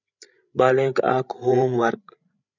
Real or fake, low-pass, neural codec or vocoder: fake; 7.2 kHz; codec, 16 kHz, 16 kbps, FreqCodec, larger model